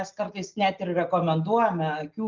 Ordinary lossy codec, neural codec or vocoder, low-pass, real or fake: Opus, 32 kbps; none; 7.2 kHz; real